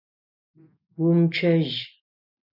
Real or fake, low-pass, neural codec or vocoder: real; 5.4 kHz; none